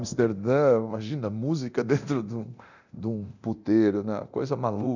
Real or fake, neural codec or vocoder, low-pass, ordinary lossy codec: fake; codec, 24 kHz, 0.9 kbps, DualCodec; 7.2 kHz; none